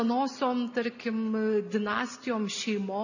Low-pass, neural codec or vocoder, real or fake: 7.2 kHz; none; real